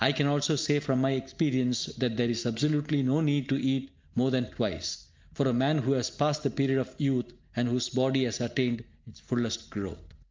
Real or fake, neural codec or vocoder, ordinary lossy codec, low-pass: real; none; Opus, 24 kbps; 7.2 kHz